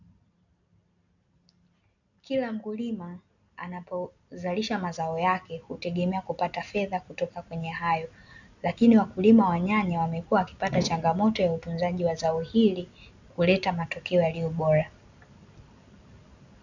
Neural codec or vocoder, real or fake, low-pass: none; real; 7.2 kHz